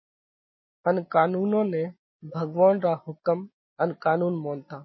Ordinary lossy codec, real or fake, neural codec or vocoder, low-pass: MP3, 24 kbps; real; none; 7.2 kHz